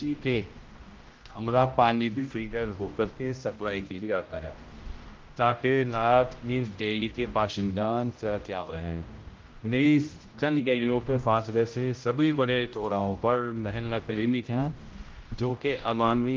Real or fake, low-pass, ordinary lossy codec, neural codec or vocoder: fake; 7.2 kHz; Opus, 32 kbps; codec, 16 kHz, 0.5 kbps, X-Codec, HuBERT features, trained on general audio